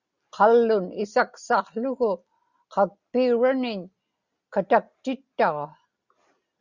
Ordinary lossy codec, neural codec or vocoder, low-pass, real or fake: Opus, 64 kbps; none; 7.2 kHz; real